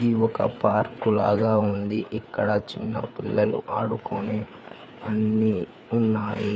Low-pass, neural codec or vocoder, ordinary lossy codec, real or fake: none; codec, 16 kHz, 4 kbps, FreqCodec, larger model; none; fake